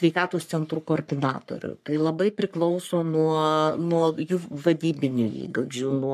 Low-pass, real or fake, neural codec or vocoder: 14.4 kHz; fake; codec, 44.1 kHz, 3.4 kbps, Pupu-Codec